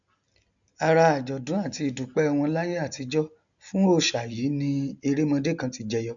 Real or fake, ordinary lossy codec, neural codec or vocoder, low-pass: real; none; none; 7.2 kHz